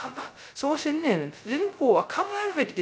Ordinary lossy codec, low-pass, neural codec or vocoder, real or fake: none; none; codec, 16 kHz, 0.2 kbps, FocalCodec; fake